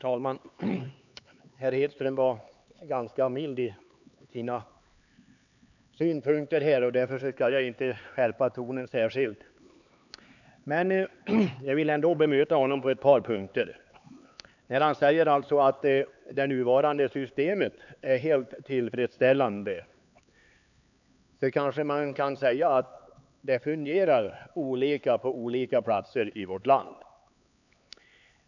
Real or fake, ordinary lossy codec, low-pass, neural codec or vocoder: fake; none; 7.2 kHz; codec, 16 kHz, 4 kbps, X-Codec, HuBERT features, trained on LibriSpeech